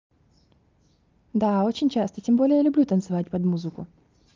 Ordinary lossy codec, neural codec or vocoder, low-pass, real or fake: Opus, 24 kbps; none; 7.2 kHz; real